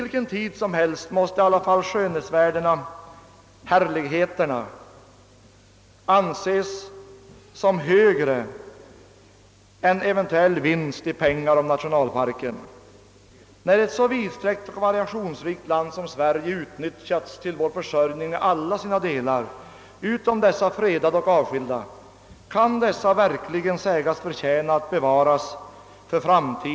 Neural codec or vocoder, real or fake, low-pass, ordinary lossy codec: none; real; none; none